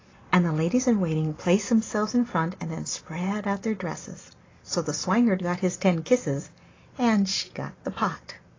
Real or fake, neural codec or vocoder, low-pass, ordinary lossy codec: real; none; 7.2 kHz; AAC, 32 kbps